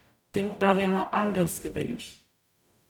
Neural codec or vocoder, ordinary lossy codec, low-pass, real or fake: codec, 44.1 kHz, 0.9 kbps, DAC; none; 19.8 kHz; fake